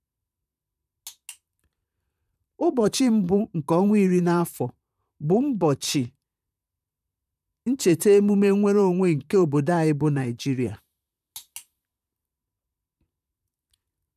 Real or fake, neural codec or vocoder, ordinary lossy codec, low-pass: fake; vocoder, 44.1 kHz, 128 mel bands, Pupu-Vocoder; none; 14.4 kHz